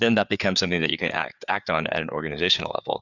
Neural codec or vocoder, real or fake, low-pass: codec, 16 kHz, 4 kbps, FreqCodec, larger model; fake; 7.2 kHz